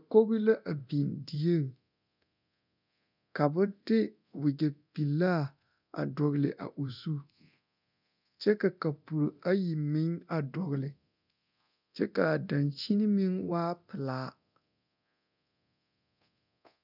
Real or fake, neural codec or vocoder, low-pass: fake; codec, 24 kHz, 0.9 kbps, DualCodec; 5.4 kHz